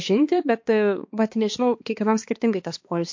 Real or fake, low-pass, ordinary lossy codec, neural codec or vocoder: fake; 7.2 kHz; MP3, 48 kbps; codec, 16 kHz, 2 kbps, X-Codec, HuBERT features, trained on balanced general audio